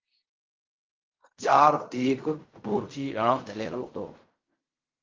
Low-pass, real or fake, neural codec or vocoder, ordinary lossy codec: 7.2 kHz; fake; codec, 16 kHz in and 24 kHz out, 0.4 kbps, LongCat-Audio-Codec, fine tuned four codebook decoder; Opus, 32 kbps